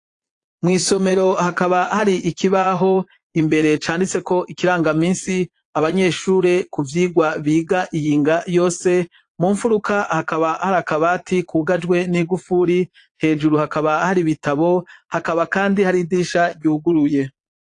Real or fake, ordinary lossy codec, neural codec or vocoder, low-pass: fake; AAC, 48 kbps; vocoder, 22.05 kHz, 80 mel bands, Vocos; 9.9 kHz